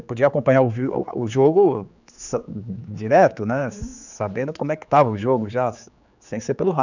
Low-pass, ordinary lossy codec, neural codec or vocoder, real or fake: 7.2 kHz; none; codec, 16 kHz, 4 kbps, X-Codec, HuBERT features, trained on general audio; fake